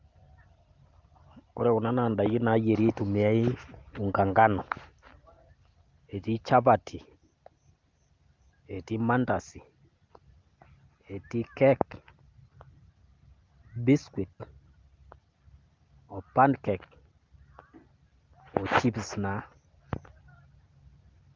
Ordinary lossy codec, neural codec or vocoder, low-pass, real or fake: Opus, 32 kbps; none; 7.2 kHz; real